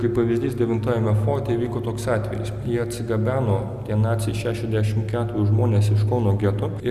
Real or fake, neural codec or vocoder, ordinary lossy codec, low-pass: real; none; Opus, 64 kbps; 14.4 kHz